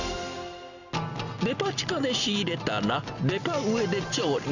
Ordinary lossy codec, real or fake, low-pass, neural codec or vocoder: none; real; 7.2 kHz; none